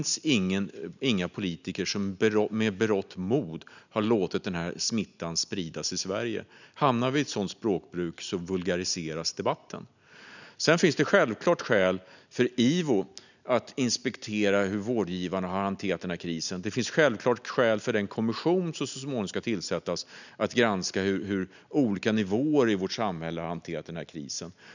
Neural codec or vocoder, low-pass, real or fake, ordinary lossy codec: none; 7.2 kHz; real; none